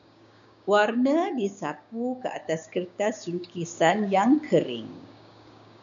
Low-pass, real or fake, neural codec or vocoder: 7.2 kHz; fake; codec, 16 kHz, 6 kbps, DAC